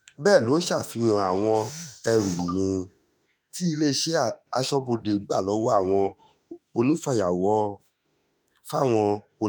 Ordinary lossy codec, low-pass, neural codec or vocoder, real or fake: none; none; autoencoder, 48 kHz, 32 numbers a frame, DAC-VAE, trained on Japanese speech; fake